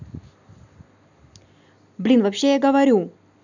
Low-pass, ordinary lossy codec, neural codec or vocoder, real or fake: 7.2 kHz; none; none; real